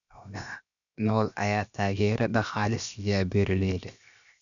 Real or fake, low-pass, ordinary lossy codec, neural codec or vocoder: fake; 7.2 kHz; none; codec, 16 kHz, 0.7 kbps, FocalCodec